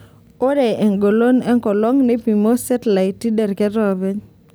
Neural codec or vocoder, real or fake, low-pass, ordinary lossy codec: none; real; none; none